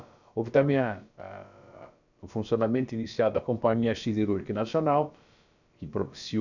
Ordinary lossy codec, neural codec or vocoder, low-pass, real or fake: none; codec, 16 kHz, about 1 kbps, DyCAST, with the encoder's durations; 7.2 kHz; fake